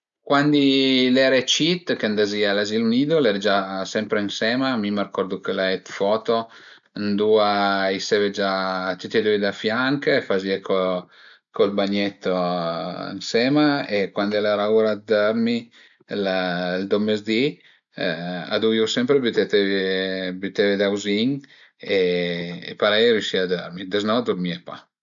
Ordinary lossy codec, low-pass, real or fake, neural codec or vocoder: MP3, 64 kbps; 7.2 kHz; real; none